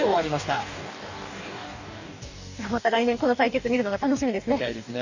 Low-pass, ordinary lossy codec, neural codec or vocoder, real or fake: 7.2 kHz; none; codec, 44.1 kHz, 2.6 kbps, DAC; fake